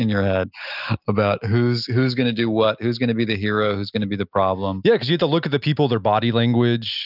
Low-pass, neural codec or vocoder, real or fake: 5.4 kHz; none; real